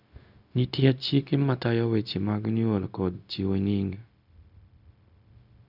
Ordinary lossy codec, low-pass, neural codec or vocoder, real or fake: none; 5.4 kHz; codec, 16 kHz, 0.4 kbps, LongCat-Audio-Codec; fake